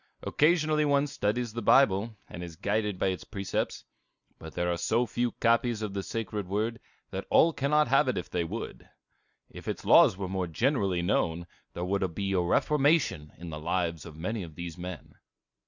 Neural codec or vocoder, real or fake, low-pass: none; real; 7.2 kHz